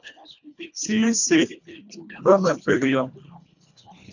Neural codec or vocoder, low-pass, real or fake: codec, 24 kHz, 1.5 kbps, HILCodec; 7.2 kHz; fake